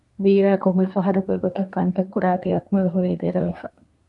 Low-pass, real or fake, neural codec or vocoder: 10.8 kHz; fake; codec, 24 kHz, 1 kbps, SNAC